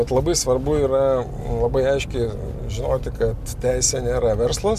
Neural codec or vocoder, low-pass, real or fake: none; 14.4 kHz; real